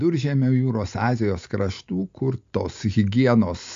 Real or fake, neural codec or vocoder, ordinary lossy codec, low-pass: real; none; MP3, 48 kbps; 7.2 kHz